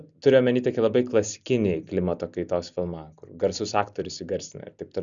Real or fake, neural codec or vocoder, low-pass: real; none; 7.2 kHz